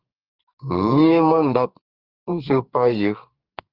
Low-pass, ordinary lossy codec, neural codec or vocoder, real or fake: 5.4 kHz; Opus, 24 kbps; codec, 44.1 kHz, 2.6 kbps, SNAC; fake